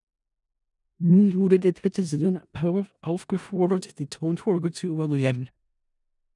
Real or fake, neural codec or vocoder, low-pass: fake; codec, 16 kHz in and 24 kHz out, 0.4 kbps, LongCat-Audio-Codec, four codebook decoder; 10.8 kHz